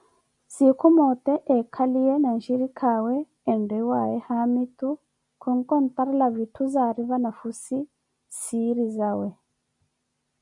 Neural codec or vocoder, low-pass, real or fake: none; 10.8 kHz; real